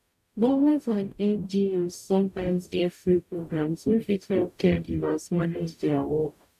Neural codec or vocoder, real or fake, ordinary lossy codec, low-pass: codec, 44.1 kHz, 0.9 kbps, DAC; fake; none; 14.4 kHz